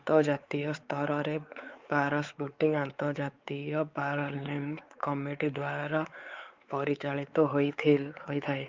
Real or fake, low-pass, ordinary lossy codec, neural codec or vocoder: fake; 7.2 kHz; Opus, 32 kbps; codec, 16 kHz, 4 kbps, X-Codec, WavLM features, trained on Multilingual LibriSpeech